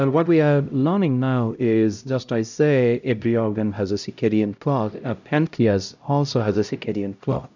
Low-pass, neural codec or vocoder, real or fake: 7.2 kHz; codec, 16 kHz, 0.5 kbps, X-Codec, HuBERT features, trained on LibriSpeech; fake